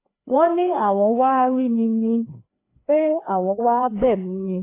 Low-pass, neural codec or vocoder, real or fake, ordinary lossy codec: 3.6 kHz; codec, 16 kHz, 2 kbps, FreqCodec, larger model; fake; AAC, 24 kbps